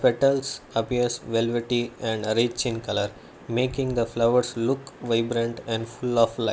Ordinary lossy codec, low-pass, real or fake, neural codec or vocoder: none; none; real; none